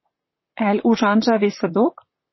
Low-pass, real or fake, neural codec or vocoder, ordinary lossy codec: 7.2 kHz; fake; vocoder, 44.1 kHz, 128 mel bands, Pupu-Vocoder; MP3, 24 kbps